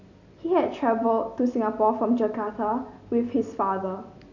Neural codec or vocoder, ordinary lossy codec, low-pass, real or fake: none; AAC, 48 kbps; 7.2 kHz; real